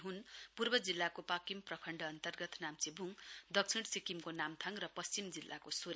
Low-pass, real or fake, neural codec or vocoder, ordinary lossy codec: none; real; none; none